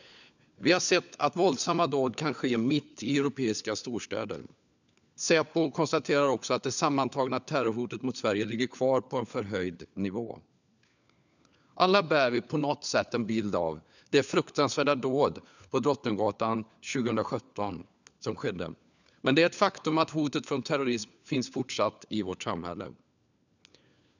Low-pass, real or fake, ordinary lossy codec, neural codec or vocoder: 7.2 kHz; fake; none; codec, 16 kHz, 4 kbps, FunCodec, trained on LibriTTS, 50 frames a second